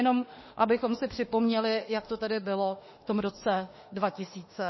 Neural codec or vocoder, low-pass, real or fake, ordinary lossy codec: autoencoder, 48 kHz, 32 numbers a frame, DAC-VAE, trained on Japanese speech; 7.2 kHz; fake; MP3, 24 kbps